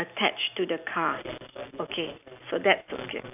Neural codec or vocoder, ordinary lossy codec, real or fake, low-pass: none; AAC, 32 kbps; real; 3.6 kHz